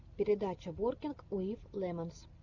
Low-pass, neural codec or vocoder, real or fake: 7.2 kHz; none; real